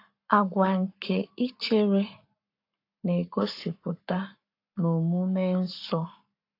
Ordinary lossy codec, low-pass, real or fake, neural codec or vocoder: AAC, 32 kbps; 5.4 kHz; fake; codec, 44.1 kHz, 7.8 kbps, Pupu-Codec